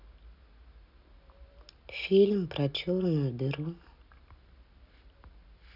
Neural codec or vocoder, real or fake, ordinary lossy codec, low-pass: none; real; none; 5.4 kHz